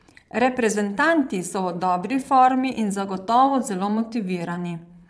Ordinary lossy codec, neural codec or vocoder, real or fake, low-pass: none; vocoder, 22.05 kHz, 80 mel bands, Vocos; fake; none